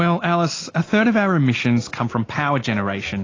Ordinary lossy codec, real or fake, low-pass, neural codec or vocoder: AAC, 32 kbps; real; 7.2 kHz; none